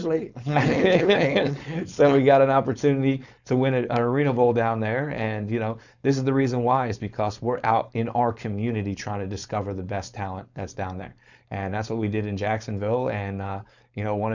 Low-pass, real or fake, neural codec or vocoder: 7.2 kHz; fake; codec, 16 kHz, 4.8 kbps, FACodec